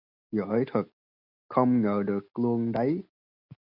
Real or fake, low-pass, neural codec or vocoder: real; 5.4 kHz; none